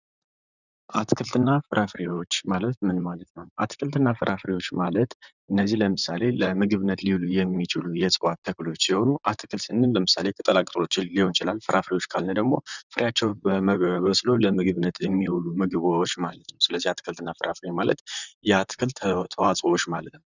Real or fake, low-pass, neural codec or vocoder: fake; 7.2 kHz; vocoder, 44.1 kHz, 128 mel bands, Pupu-Vocoder